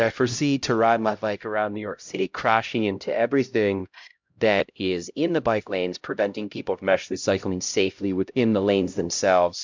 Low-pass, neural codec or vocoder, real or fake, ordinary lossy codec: 7.2 kHz; codec, 16 kHz, 0.5 kbps, X-Codec, HuBERT features, trained on LibriSpeech; fake; MP3, 64 kbps